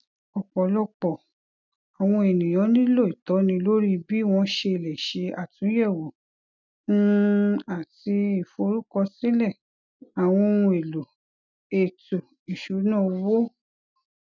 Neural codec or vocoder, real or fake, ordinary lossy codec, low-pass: none; real; none; 7.2 kHz